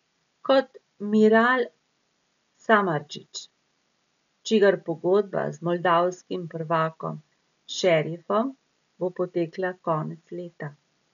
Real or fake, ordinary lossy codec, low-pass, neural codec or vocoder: real; none; 7.2 kHz; none